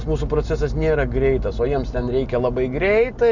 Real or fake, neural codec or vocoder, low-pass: real; none; 7.2 kHz